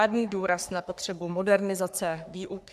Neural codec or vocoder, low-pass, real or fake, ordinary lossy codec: codec, 44.1 kHz, 3.4 kbps, Pupu-Codec; 14.4 kHz; fake; AAC, 96 kbps